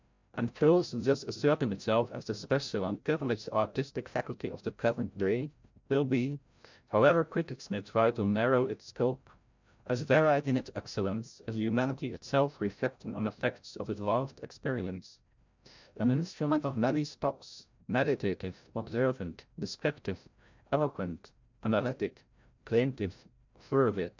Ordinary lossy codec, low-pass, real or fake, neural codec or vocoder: MP3, 64 kbps; 7.2 kHz; fake; codec, 16 kHz, 0.5 kbps, FreqCodec, larger model